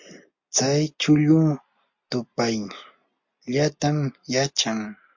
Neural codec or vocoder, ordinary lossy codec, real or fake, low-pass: none; MP3, 48 kbps; real; 7.2 kHz